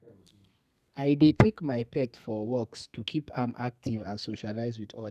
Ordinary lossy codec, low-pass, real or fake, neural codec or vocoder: none; 14.4 kHz; fake; codec, 32 kHz, 1.9 kbps, SNAC